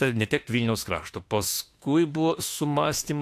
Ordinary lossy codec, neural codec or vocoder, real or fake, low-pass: AAC, 64 kbps; autoencoder, 48 kHz, 32 numbers a frame, DAC-VAE, trained on Japanese speech; fake; 14.4 kHz